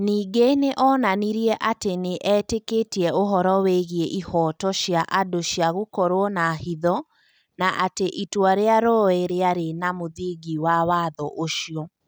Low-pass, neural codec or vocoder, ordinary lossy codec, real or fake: none; none; none; real